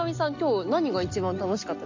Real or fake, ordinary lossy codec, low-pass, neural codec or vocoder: real; MP3, 64 kbps; 7.2 kHz; none